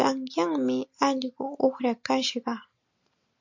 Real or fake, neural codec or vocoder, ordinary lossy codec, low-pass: real; none; MP3, 48 kbps; 7.2 kHz